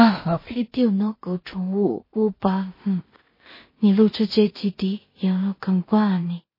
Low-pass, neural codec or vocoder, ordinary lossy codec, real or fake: 5.4 kHz; codec, 16 kHz in and 24 kHz out, 0.4 kbps, LongCat-Audio-Codec, two codebook decoder; MP3, 24 kbps; fake